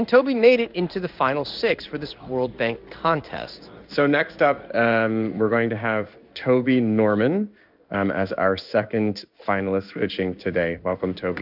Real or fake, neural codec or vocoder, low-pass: fake; codec, 16 kHz in and 24 kHz out, 1 kbps, XY-Tokenizer; 5.4 kHz